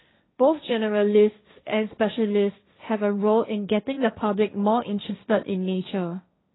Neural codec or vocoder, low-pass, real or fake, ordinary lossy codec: codec, 16 kHz, 1.1 kbps, Voila-Tokenizer; 7.2 kHz; fake; AAC, 16 kbps